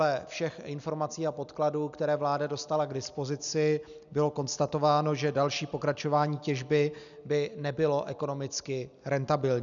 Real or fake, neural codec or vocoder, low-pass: real; none; 7.2 kHz